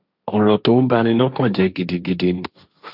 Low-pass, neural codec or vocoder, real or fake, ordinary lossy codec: 5.4 kHz; codec, 16 kHz, 1.1 kbps, Voila-Tokenizer; fake; none